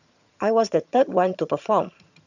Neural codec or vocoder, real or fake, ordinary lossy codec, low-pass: vocoder, 22.05 kHz, 80 mel bands, HiFi-GAN; fake; none; 7.2 kHz